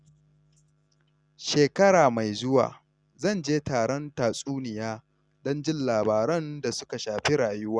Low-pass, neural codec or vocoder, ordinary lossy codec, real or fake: 9.9 kHz; none; Opus, 64 kbps; real